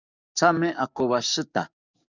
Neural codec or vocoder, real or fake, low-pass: codec, 44.1 kHz, 7.8 kbps, DAC; fake; 7.2 kHz